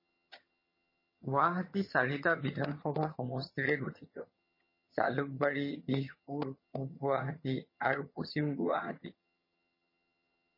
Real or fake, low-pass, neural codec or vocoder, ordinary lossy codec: fake; 5.4 kHz; vocoder, 22.05 kHz, 80 mel bands, HiFi-GAN; MP3, 24 kbps